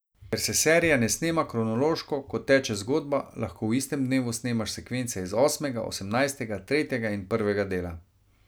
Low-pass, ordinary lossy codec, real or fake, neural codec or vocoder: none; none; real; none